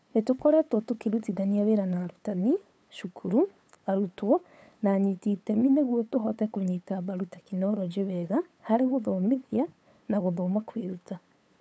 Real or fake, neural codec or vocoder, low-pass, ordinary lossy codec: fake; codec, 16 kHz, 8 kbps, FunCodec, trained on LibriTTS, 25 frames a second; none; none